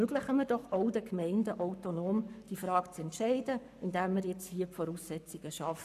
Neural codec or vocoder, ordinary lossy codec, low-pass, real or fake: codec, 44.1 kHz, 7.8 kbps, Pupu-Codec; none; 14.4 kHz; fake